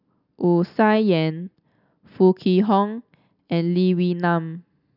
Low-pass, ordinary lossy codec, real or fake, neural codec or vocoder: 5.4 kHz; none; real; none